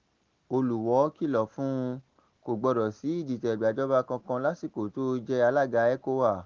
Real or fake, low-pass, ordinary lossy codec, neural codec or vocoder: real; 7.2 kHz; Opus, 16 kbps; none